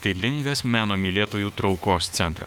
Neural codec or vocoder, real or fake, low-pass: autoencoder, 48 kHz, 32 numbers a frame, DAC-VAE, trained on Japanese speech; fake; 19.8 kHz